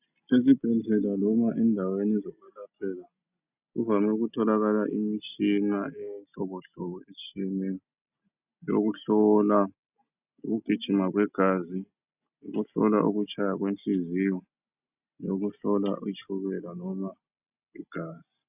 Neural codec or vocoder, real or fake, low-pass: none; real; 3.6 kHz